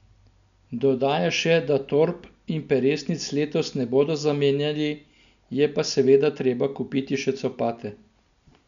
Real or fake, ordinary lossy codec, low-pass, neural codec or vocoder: real; none; 7.2 kHz; none